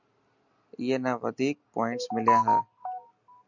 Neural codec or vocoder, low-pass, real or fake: none; 7.2 kHz; real